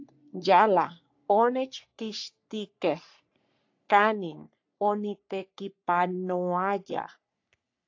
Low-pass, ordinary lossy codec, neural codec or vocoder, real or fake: 7.2 kHz; AAC, 48 kbps; codec, 44.1 kHz, 3.4 kbps, Pupu-Codec; fake